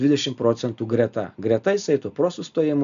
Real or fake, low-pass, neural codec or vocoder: real; 7.2 kHz; none